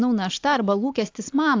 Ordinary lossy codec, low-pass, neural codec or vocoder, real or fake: AAC, 48 kbps; 7.2 kHz; none; real